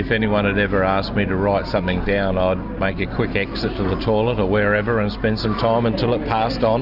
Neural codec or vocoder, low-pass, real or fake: none; 5.4 kHz; real